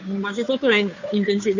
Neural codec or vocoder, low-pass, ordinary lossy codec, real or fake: codec, 16 kHz, 2 kbps, FunCodec, trained on Chinese and English, 25 frames a second; 7.2 kHz; none; fake